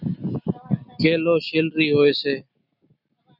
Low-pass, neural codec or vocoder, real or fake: 5.4 kHz; none; real